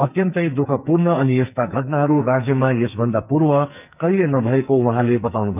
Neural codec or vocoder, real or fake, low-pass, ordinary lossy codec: codec, 44.1 kHz, 2.6 kbps, SNAC; fake; 3.6 kHz; none